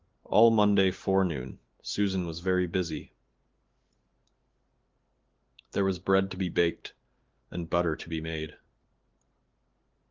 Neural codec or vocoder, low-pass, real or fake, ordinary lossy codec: vocoder, 44.1 kHz, 128 mel bands every 512 samples, BigVGAN v2; 7.2 kHz; fake; Opus, 16 kbps